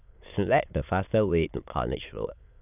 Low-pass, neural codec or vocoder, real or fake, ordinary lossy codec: 3.6 kHz; autoencoder, 22.05 kHz, a latent of 192 numbers a frame, VITS, trained on many speakers; fake; none